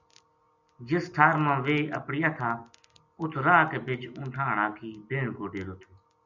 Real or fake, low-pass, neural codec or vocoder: real; 7.2 kHz; none